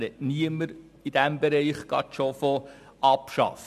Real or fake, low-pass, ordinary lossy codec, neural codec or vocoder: real; 14.4 kHz; AAC, 96 kbps; none